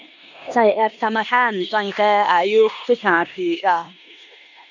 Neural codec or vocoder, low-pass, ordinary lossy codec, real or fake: codec, 16 kHz in and 24 kHz out, 0.9 kbps, LongCat-Audio-Codec, four codebook decoder; 7.2 kHz; none; fake